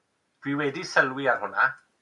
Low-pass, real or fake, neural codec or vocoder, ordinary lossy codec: 10.8 kHz; real; none; AAC, 64 kbps